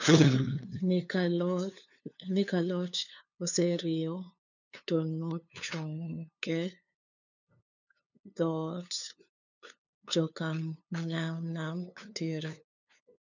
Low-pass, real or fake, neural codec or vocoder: 7.2 kHz; fake; codec, 16 kHz, 2 kbps, FunCodec, trained on LibriTTS, 25 frames a second